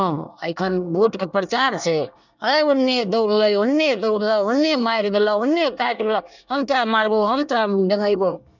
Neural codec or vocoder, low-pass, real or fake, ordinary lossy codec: codec, 24 kHz, 1 kbps, SNAC; 7.2 kHz; fake; none